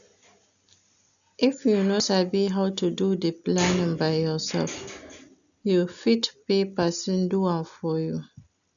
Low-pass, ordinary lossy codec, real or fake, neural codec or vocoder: 7.2 kHz; none; real; none